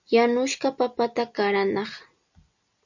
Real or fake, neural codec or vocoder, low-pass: real; none; 7.2 kHz